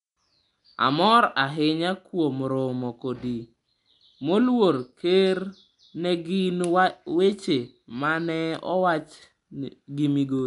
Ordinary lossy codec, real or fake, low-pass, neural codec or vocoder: none; real; 10.8 kHz; none